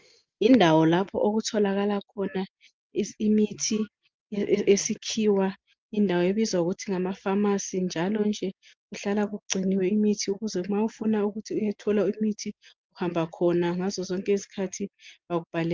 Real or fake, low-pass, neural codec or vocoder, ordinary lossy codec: real; 7.2 kHz; none; Opus, 24 kbps